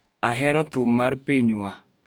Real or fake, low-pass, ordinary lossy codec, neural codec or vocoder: fake; none; none; codec, 44.1 kHz, 2.6 kbps, DAC